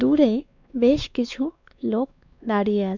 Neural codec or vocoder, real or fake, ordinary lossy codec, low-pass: codec, 16 kHz, 2 kbps, X-Codec, WavLM features, trained on Multilingual LibriSpeech; fake; none; 7.2 kHz